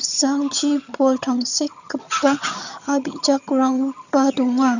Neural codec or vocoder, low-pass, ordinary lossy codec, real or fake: vocoder, 22.05 kHz, 80 mel bands, HiFi-GAN; 7.2 kHz; none; fake